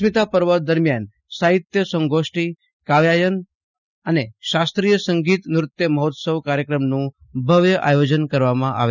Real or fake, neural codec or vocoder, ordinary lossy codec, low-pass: real; none; none; 7.2 kHz